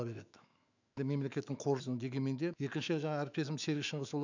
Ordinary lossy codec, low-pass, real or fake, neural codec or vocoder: none; 7.2 kHz; fake; autoencoder, 48 kHz, 128 numbers a frame, DAC-VAE, trained on Japanese speech